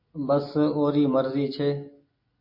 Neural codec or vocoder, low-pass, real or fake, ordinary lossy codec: none; 5.4 kHz; real; MP3, 32 kbps